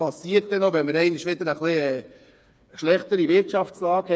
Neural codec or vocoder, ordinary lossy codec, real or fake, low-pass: codec, 16 kHz, 4 kbps, FreqCodec, smaller model; none; fake; none